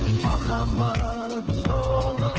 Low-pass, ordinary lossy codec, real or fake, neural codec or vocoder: 7.2 kHz; Opus, 16 kbps; fake; codec, 16 kHz, 4 kbps, FreqCodec, smaller model